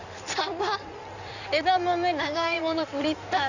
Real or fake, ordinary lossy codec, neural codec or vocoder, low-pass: fake; none; codec, 16 kHz in and 24 kHz out, 2.2 kbps, FireRedTTS-2 codec; 7.2 kHz